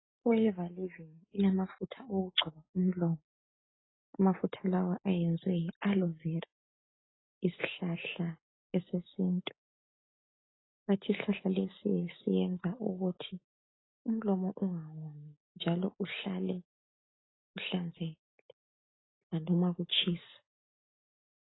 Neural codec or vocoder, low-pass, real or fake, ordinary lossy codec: none; 7.2 kHz; real; AAC, 16 kbps